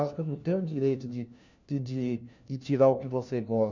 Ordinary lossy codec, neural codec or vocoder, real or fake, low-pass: none; codec, 16 kHz, 1 kbps, FunCodec, trained on LibriTTS, 50 frames a second; fake; 7.2 kHz